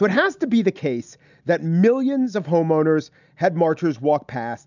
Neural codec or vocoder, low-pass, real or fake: none; 7.2 kHz; real